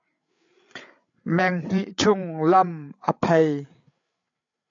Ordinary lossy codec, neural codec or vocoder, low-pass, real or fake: AAC, 64 kbps; codec, 16 kHz, 8 kbps, FreqCodec, larger model; 7.2 kHz; fake